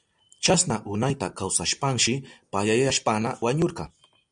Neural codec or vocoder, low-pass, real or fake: none; 9.9 kHz; real